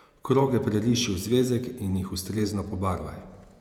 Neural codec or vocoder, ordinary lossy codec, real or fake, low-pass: vocoder, 48 kHz, 128 mel bands, Vocos; none; fake; 19.8 kHz